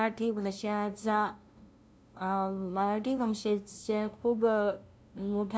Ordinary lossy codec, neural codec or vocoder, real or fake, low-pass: none; codec, 16 kHz, 0.5 kbps, FunCodec, trained on LibriTTS, 25 frames a second; fake; none